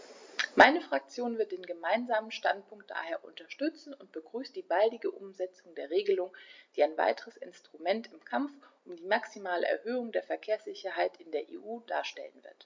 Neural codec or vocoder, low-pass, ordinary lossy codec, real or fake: none; 7.2 kHz; MP3, 64 kbps; real